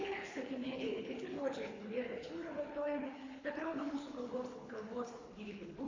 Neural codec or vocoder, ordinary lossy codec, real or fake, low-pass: codec, 24 kHz, 3 kbps, HILCodec; AAC, 32 kbps; fake; 7.2 kHz